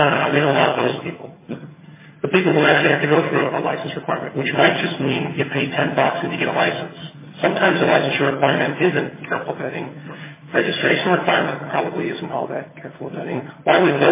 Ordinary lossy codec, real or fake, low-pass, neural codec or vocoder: MP3, 16 kbps; fake; 3.6 kHz; vocoder, 22.05 kHz, 80 mel bands, HiFi-GAN